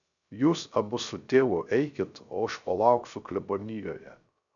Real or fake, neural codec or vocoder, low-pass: fake; codec, 16 kHz, 0.3 kbps, FocalCodec; 7.2 kHz